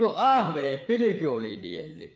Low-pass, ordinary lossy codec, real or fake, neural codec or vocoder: none; none; fake; codec, 16 kHz, 4 kbps, FreqCodec, larger model